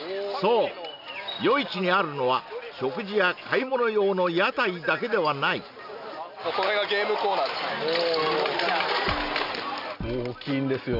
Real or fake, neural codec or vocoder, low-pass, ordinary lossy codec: real; none; 5.4 kHz; none